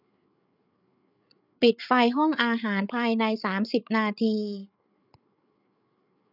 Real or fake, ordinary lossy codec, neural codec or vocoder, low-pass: fake; none; codec, 16 kHz, 8 kbps, FreqCodec, larger model; 5.4 kHz